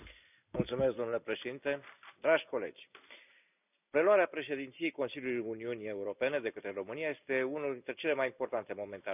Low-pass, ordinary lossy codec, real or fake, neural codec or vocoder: 3.6 kHz; none; real; none